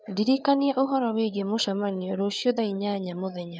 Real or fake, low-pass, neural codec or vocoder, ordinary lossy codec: fake; none; codec, 16 kHz, 8 kbps, FreqCodec, larger model; none